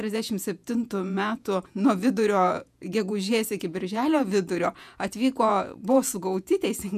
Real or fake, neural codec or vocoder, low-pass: fake; vocoder, 48 kHz, 128 mel bands, Vocos; 14.4 kHz